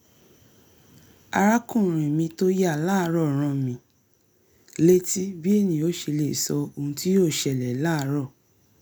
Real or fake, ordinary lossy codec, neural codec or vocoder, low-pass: real; none; none; none